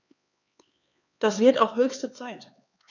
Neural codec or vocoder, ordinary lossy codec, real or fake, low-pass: codec, 16 kHz, 4 kbps, X-Codec, HuBERT features, trained on LibriSpeech; none; fake; 7.2 kHz